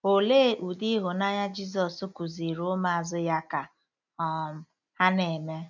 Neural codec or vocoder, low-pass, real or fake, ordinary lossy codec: none; 7.2 kHz; real; none